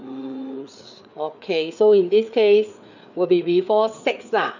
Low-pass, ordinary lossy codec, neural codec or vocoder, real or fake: 7.2 kHz; none; codec, 16 kHz, 4 kbps, FreqCodec, larger model; fake